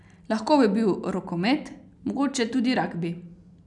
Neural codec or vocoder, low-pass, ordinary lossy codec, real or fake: none; 10.8 kHz; none; real